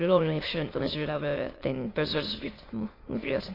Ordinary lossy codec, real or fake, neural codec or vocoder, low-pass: AAC, 24 kbps; fake; autoencoder, 22.05 kHz, a latent of 192 numbers a frame, VITS, trained on many speakers; 5.4 kHz